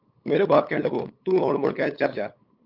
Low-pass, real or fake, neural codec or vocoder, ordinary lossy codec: 5.4 kHz; fake; codec, 16 kHz, 8 kbps, FunCodec, trained on LibriTTS, 25 frames a second; Opus, 24 kbps